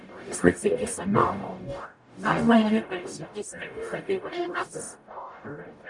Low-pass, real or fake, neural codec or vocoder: 10.8 kHz; fake; codec, 44.1 kHz, 0.9 kbps, DAC